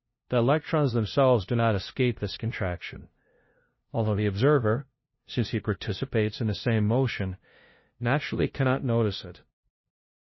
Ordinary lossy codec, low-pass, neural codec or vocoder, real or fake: MP3, 24 kbps; 7.2 kHz; codec, 16 kHz, 0.5 kbps, FunCodec, trained on LibriTTS, 25 frames a second; fake